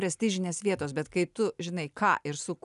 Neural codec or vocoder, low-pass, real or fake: none; 10.8 kHz; real